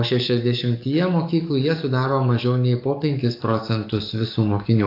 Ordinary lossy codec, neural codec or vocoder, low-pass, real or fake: AAC, 32 kbps; codec, 44.1 kHz, 7.8 kbps, DAC; 5.4 kHz; fake